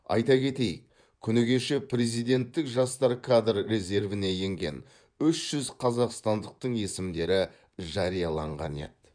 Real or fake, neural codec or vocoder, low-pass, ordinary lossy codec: fake; vocoder, 24 kHz, 100 mel bands, Vocos; 9.9 kHz; none